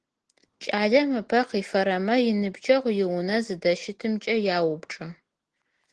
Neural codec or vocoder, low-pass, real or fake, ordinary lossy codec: none; 9.9 kHz; real; Opus, 16 kbps